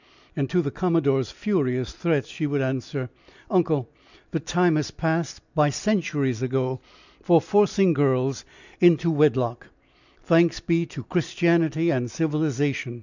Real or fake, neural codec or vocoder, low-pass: real; none; 7.2 kHz